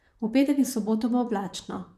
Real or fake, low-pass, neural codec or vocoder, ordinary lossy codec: fake; 14.4 kHz; vocoder, 44.1 kHz, 128 mel bands, Pupu-Vocoder; none